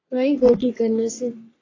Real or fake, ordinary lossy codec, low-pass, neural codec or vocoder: fake; AAC, 32 kbps; 7.2 kHz; codec, 44.1 kHz, 3.4 kbps, Pupu-Codec